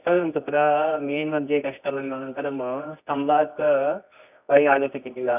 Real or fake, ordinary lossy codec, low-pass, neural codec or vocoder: fake; none; 3.6 kHz; codec, 24 kHz, 0.9 kbps, WavTokenizer, medium music audio release